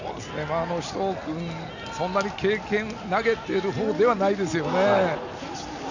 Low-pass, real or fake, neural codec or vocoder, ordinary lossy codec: 7.2 kHz; real; none; none